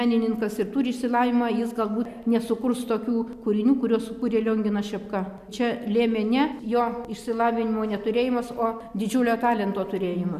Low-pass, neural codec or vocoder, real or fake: 14.4 kHz; none; real